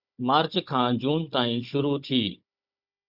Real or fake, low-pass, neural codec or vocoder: fake; 5.4 kHz; codec, 16 kHz, 16 kbps, FunCodec, trained on Chinese and English, 50 frames a second